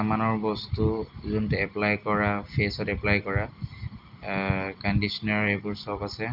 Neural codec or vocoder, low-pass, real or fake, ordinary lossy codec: none; 5.4 kHz; real; Opus, 32 kbps